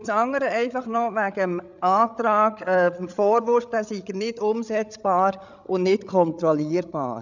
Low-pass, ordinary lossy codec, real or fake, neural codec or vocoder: 7.2 kHz; none; fake; codec, 16 kHz, 8 kbps, FreqCodec, larger model